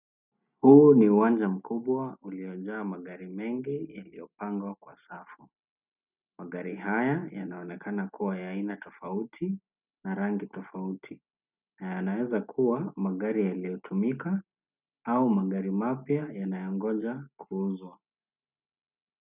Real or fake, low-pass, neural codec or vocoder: real; 3.6 kHz; none